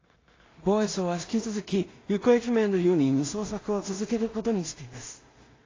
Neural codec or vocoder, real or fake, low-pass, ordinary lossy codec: codec, 16 kHz in and 24 kHz out, 0.4 kbps, LongCat-Audio-Codec, two codebook decoder; fake; 7.2 kHz; AAC, 32 kbps